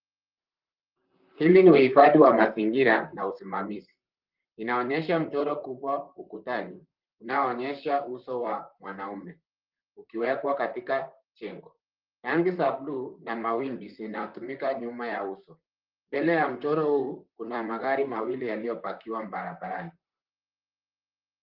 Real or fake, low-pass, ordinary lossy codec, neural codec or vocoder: fake; 5.4 kHz; Opus, 32 kbps; vocoder, 44.1 kHz, 128 mel bands, Pupu-Vocoder